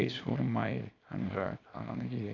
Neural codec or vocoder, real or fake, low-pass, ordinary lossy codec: codec, 24 kHz, 0.9 kbps, WavTokenizer, small release; fake; 7.2 kHz; Opus, 64 kbps